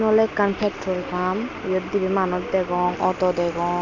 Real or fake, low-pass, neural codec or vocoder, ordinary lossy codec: real; 7.2 kHz; none; none